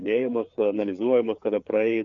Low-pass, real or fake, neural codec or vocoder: 7.2 kHz; fake; codec, 16 kHz, 4 kbps, FreqCodec, larger model